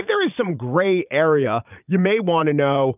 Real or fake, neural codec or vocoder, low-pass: fake; vocoder, 22.05 kHz, 80 mel bands, Vocos; 3.6 kHz